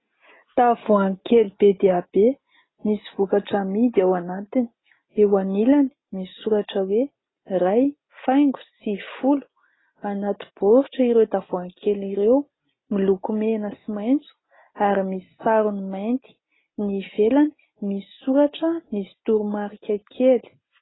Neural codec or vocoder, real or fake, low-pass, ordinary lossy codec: none; real; 7.2 kHz; AAC, 16 kbps